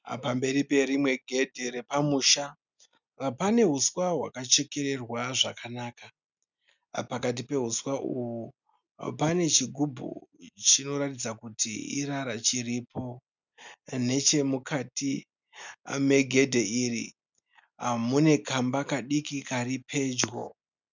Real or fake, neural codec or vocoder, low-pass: real; none; 7.2 kHz